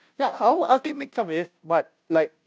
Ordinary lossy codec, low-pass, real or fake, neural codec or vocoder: none; none; fake; codec, 16 kHz, 0.5 kbps, FunCodec, trained on Chinese and English, 25 frames a second